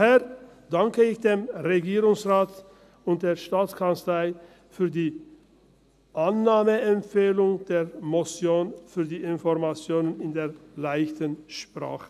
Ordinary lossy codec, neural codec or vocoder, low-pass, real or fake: none; none; 14.4 kHz; real